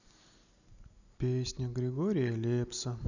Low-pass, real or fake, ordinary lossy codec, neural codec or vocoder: 7.2 kHz; real; none; none